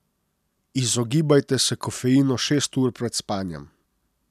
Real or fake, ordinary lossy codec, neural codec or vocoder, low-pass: real; none; none; 14.4 kHz